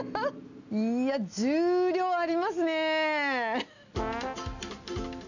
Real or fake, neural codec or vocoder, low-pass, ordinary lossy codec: real; none; 7.2 kHz; none